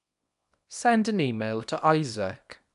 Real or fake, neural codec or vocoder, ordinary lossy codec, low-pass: fake; codec, 24 kHz, 0.9 kbps, WavTokenizer, small release; none; 10.8 kHz